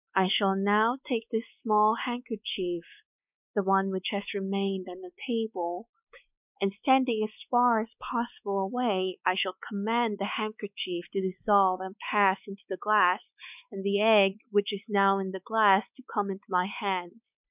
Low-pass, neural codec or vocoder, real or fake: 3.6 kHz; codec, 16 kHz, 4 kbps, X-Codec, WavLM features, trained on Multilingual LibriSpeech; fake